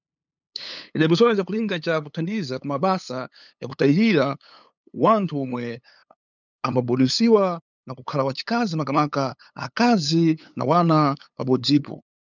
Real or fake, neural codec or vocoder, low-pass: fake; codec, 16 kHz, 8 kbps, FunCodec, trained on LibriTTS, 25 frames a second; 7.2 kHz